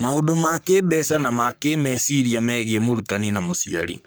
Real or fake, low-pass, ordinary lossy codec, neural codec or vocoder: fake; none; none; codec, 44.1 kHz, 3.4 kbps, Pupu-Codec